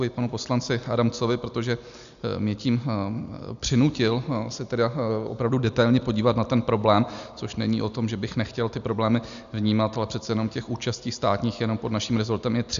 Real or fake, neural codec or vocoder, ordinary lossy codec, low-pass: real; none; AAC, 96 kbps; 7.2 kHz